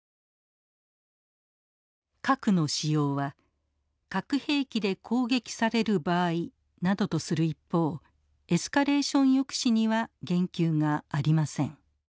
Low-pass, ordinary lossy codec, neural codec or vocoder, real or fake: none; none; none; real